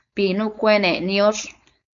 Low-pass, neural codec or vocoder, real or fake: 7.2 kHz; codec, 16 kHz, 4.8 kbps, FACodec; fake